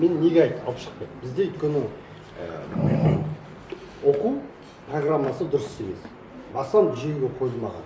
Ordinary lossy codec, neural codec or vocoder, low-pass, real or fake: none; none; none; real